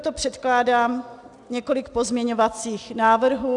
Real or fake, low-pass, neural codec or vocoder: real; 10.8 kHz; none